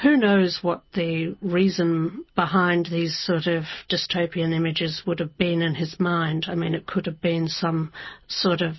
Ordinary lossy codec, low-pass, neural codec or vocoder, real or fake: MP3, 24 kbps; 7.2 kHz; none; real